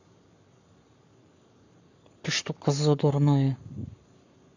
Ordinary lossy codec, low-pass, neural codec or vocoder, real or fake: none; 7.2 kHz; codec, 44.1 kHz, 7.8 kbps, Pupu-Codec; fake